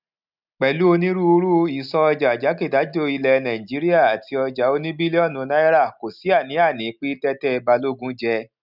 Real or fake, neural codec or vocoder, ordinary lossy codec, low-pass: real; none; none; 5.4 kHz